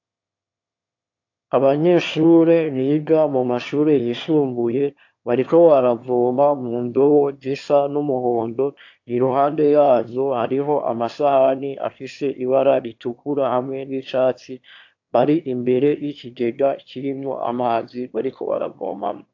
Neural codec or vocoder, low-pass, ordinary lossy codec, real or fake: autoencoder, 22.05 kHz, a latent of 192 numbers a frame, VITS, trained on one speaker; 7.2 kHz; AAC, 48 kbps; fake